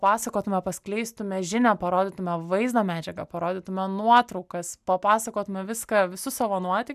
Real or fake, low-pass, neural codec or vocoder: real; 14.4 kHz; none